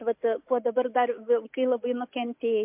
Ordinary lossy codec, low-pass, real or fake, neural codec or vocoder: MP3, 32 kbps; 3.6 kHz; real; none